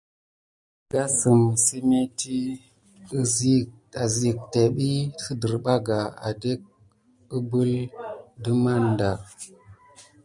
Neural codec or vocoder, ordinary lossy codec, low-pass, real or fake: none; MP3, 96 kbps; 10.8 kHz; real